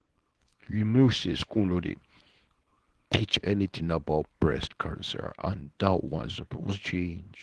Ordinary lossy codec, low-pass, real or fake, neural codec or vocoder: Opus, 16 kbps; 10.8 kHz; fake; codec, 24 kHz, 0.9 kbps, WavTokenizer, small release